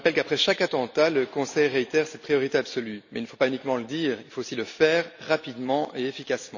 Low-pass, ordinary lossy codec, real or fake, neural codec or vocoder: 7.2 kHz; none; real; none